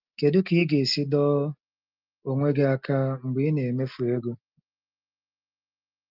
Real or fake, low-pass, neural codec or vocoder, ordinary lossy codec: real; 5.4 kHz; none; Opus, 32 kbps